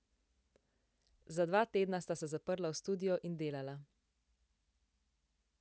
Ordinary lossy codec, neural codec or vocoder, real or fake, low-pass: none; none; real; none